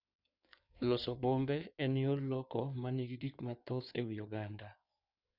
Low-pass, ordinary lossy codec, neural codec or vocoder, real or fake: 5.4 kHz; none; codec, 16 kHz in and 24 kHz out, 2.2 kbps, FireRedTTS-2 codec; fake